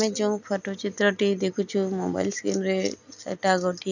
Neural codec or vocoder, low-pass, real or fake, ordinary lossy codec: none; 7.2 kHz; real; none